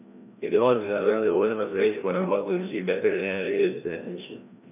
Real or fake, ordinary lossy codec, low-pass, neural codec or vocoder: fake; none; 3.6 kHz; codec, 16 kHz, 1 kbps, FreqCodec, larger model